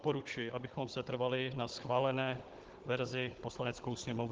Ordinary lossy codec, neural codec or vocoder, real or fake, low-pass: Opus, 16 kbps; codec, 16 kHz, 16 kbps, FunCodec, trained on Chinese and English, 50 frames a second; fake; 7.2 kHz